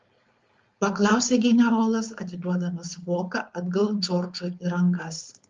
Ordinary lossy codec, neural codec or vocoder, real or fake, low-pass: Opus, 32 kbps; codec, 16 kHz, 4.8 kbps, FACodec; fake; 7.2 kHz